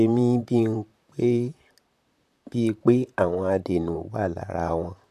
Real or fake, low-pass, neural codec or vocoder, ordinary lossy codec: fake; 14.4 kHz; vocoder, 44.1 kHz, 128 mel bands, Pupu-Vocoder; none